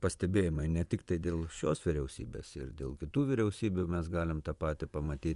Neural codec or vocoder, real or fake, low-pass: vocoder, 24 kHz, 100 mel bands, Vocos; fake; 10.8 kHz